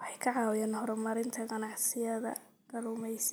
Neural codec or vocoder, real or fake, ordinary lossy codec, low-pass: none; real; none; none